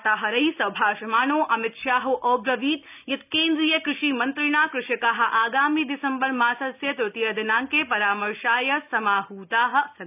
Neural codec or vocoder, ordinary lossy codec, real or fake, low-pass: none; none; real; 3.6 kHz